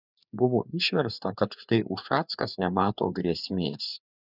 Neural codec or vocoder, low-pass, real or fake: codec, 16 kHz, 4 kbps, FreqCodec, larger model; 5.4 kHz; fake